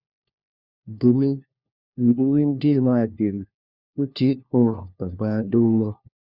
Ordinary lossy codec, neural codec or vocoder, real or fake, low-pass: Opus, 64 kbps; codec, 16 kHz, 1 kbps, FunCodec, trained on LibriTTS, 50 frames a second; fake; 5.4 kHz